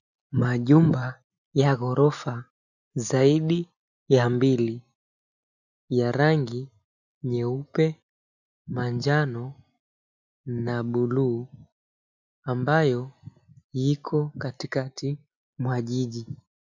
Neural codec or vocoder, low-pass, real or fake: none; 7.2 kHz; real